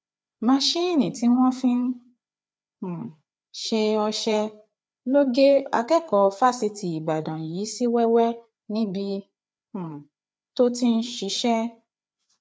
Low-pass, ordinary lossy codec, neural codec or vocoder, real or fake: none; none; codec, 16 kHz, 4 kbps, FreqCodec, larger model; fake